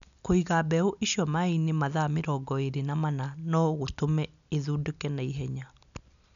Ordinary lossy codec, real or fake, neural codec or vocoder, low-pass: none; real; none; 7.2 kHz